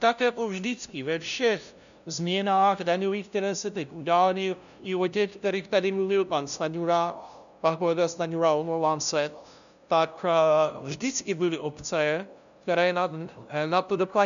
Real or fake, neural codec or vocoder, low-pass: fake; codec, 16 kHz, 0.5 kbps, FunCodec, trained on LibriTTS, 25 frames a second; 7.2 kHz